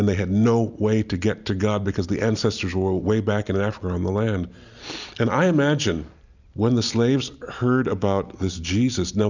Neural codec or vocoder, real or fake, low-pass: none; real; 7.2 kHz